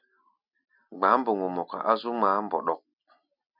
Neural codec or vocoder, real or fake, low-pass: none; real; 5.4 kHz